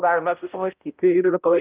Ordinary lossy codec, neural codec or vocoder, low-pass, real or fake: Opus, 24 kbps; codec, 16 kHz, 0.5 kbps, X-Codec, HuBERT features, trained on balanced general audio; 3.6 kHz; fake